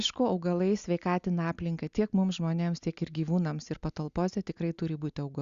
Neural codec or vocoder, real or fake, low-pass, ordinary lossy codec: none; real; 7.2 kHz; Opus, 64 kbps